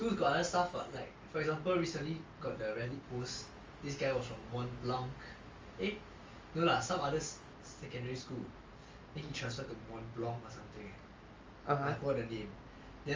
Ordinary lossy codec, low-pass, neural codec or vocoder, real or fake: Opus, 32 kbps; 7.2 kHz; none; real